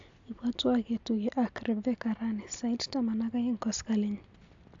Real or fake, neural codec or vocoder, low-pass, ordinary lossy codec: real; none; 7.2 kHz; AAC, 64 kbps